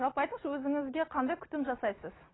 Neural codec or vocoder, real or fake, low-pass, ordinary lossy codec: none; real; 7.2 kHz; AAC, 16 kbps